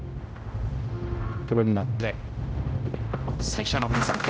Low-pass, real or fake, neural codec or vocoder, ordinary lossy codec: none; fake; codec, 16 kHz, 0.5 kbps, X-Codec, HuBERT features, trained on general audio; none